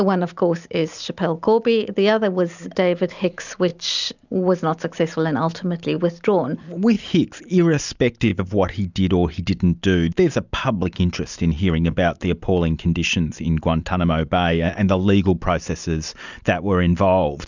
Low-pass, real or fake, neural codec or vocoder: 7.2 kHz; real; none